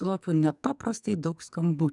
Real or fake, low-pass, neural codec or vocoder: fake; 10.8 kHz; codec, 44.1 kHz, 2.6 kbps, SNAC